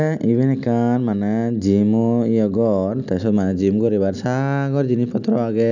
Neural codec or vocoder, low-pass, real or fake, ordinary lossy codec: none; 7.2 kHz; real; none